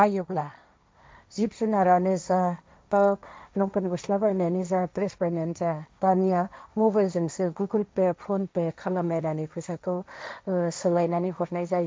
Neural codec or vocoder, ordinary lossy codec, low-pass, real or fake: codec, 16 kHz, 1.1 kbps, Voila-Tokenizer; none; none; fake